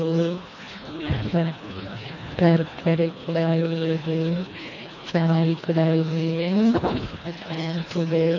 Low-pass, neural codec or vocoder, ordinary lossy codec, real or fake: 7.2 kHz; codec, 24 kHz, 1.5 kbps, HILCodec; none; fake